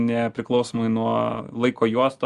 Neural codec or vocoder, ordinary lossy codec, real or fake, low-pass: none; Opus, 32 kbps; real; 10.8 kHz